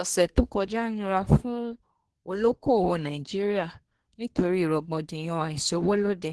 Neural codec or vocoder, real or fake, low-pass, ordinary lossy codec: codec, 24 kHz, 1 kbps, SNAC; fake; 10.8 kHz; Opus, 16 kbps